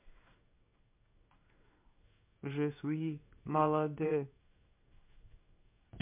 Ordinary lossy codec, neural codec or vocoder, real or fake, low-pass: MP3, 32 kbps; codec, 16 kHz in and 24 kHz out, 1 kbps, XY-Tokenizer; fake; 3.6 kHz